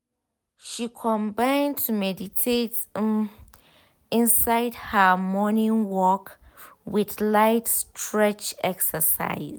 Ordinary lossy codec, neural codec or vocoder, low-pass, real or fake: none; none; none; real